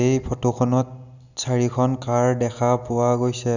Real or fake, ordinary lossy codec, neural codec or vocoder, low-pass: real; none; none; 7.2 kHz